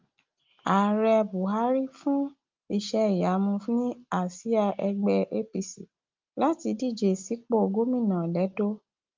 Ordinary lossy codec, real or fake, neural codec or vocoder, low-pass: Opus, 24 kbps; real; none; 7.2 kHz